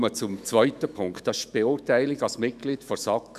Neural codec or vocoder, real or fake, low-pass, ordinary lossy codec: autoencoder, 48 kHz, 128 numbers a frame, DAC-VAE, trained on Japanese speech; fake; 14.4 kHz; none